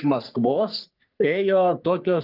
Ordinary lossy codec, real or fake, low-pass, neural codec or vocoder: Opus, 24 kbps; fake; 5.4 kHz; codec, 44.1 kHz, 3.4 kbps, Pupu-Codec